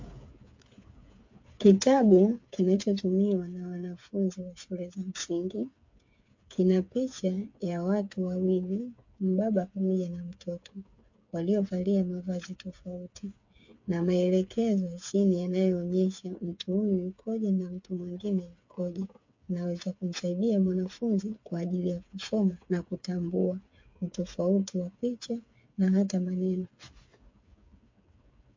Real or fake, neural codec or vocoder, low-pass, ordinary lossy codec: fake; codec, 16 kHz, 8 kbps, FreqCodec, smaller model; 7.2 kHz; MP3, 48 kbps